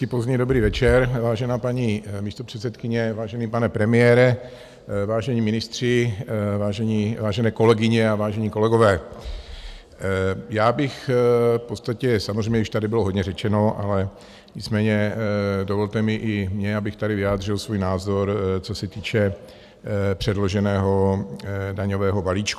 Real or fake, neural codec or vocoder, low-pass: real; none; 14.4 kHz